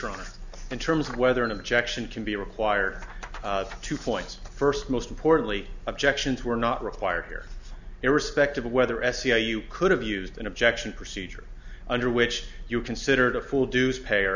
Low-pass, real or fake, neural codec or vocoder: 7.2 kHz; real; none